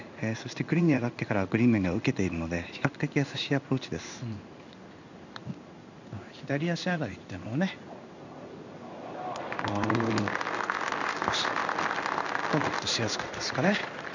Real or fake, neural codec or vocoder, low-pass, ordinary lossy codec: fake; codec, 16 kHz in and 24 kHz out, 1 kbps, XY-Tokenizer; 7.2 kHz; none